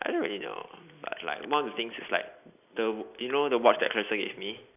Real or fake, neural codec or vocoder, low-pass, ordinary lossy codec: real; none; 3.6 kHz; none